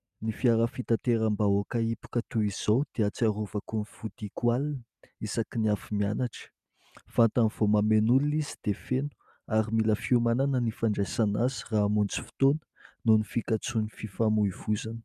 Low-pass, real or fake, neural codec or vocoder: 14.4 kHz; real; none